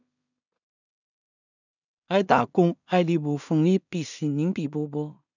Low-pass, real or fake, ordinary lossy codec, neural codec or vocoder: 7.2 kHz; fake; none; codec, 16 kHz in and 24 kHz out, 0.4 kbps, LongCat-Audio-Codec, two codebook decoder